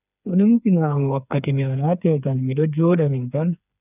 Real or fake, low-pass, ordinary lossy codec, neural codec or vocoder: fake; 3.6 kHz; none; codec, 16 kHz, 4 kbps, FreqCodec, smaller model